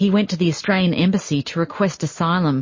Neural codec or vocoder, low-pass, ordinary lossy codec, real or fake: none; 7.2 kHz; MP3, 32 kbps; real